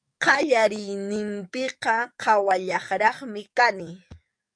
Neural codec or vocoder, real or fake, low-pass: codec, 44.1 kHz, 7.8 kbps, DAC; fake; 9.9 kHz